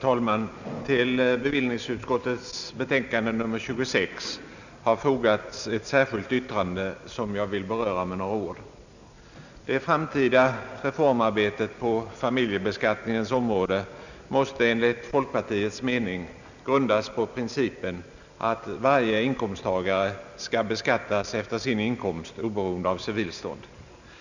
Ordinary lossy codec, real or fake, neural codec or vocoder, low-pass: none; real; none; 7.2 kHz